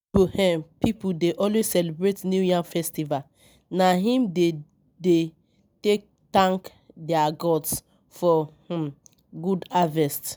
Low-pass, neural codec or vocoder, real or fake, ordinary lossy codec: none; none; real; none